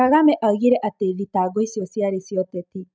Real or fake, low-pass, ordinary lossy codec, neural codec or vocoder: real; none; none; none